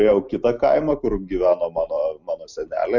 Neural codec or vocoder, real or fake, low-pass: none; real; 7.2 kHz